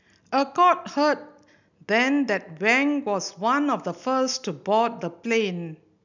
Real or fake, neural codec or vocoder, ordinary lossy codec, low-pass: real; none; none; 7.2 kHz